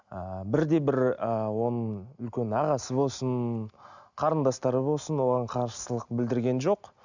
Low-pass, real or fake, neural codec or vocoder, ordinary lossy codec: 7.2 kHz; real; none; none